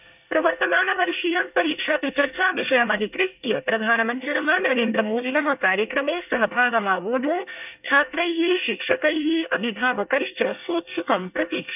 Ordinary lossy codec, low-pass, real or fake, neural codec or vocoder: none; 3.6 kHz; fake; codec, 24 kHz, 1 kbps, SNAC